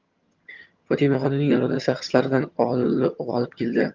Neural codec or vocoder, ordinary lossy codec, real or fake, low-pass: vocoder, 22.05 kHz, 80 mel bands, HiFi-GAN; Opus, 32 kbps; fake; 7.2 kHz